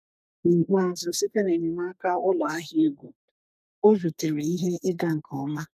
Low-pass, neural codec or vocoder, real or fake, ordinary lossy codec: 14.4 kHz; codec, 32 kHz, 1.9 kbps, SNAC; fake; none